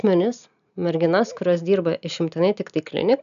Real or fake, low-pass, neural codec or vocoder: real; 7.2 kHz; none